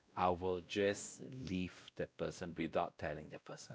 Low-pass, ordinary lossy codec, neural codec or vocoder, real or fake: none; none; codec, 16 kHz, 1 kbps, X-Codec, WavLM features, trained on Multilingual LibriSpeech; fake